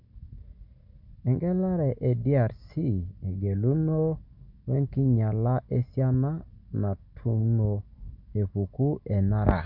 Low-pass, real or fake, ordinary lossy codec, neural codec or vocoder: 5.4 kHz; real; none; none